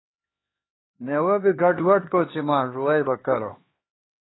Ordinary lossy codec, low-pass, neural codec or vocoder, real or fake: AAC, 16 kbps; 7.2 kHz; codec, 16 kHz, 2 kbps, X-Codec, HuBERT features, trained on LibriSpeech; fake